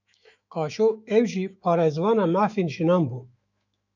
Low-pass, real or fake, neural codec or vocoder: 7.2 kHz; fake; autoencoder, 48 kHz, 128 numbers a frame, DAC-VAE, trained on Japanese speech